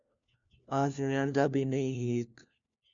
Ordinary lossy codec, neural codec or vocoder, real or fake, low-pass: MP3, 64 kbps; codec, 16 kHz, 1 kbps, FunCodec, trained on LibriTTS, 50 frames a second; fake; 7.2 kHz